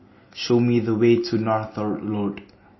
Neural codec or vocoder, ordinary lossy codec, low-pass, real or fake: none; MP3, 24 kbps; 7.2 kHz; real